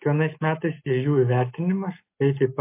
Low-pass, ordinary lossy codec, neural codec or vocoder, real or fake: 3.6 kHz; MP3, 24 kbps; none; real